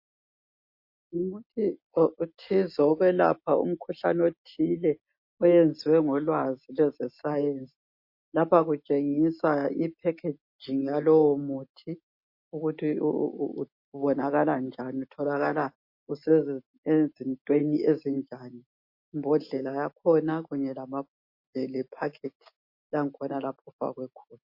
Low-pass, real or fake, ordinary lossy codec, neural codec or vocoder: 5.4 kHz; real; MP3, 32 kbps; none